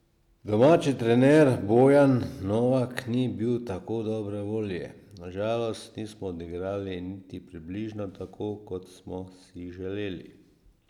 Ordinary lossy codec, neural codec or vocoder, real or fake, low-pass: none; none; real; 19.8 kHz